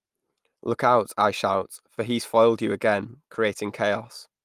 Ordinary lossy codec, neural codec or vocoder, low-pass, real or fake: Opus, 32 kbps; none; 14.4 kHz; real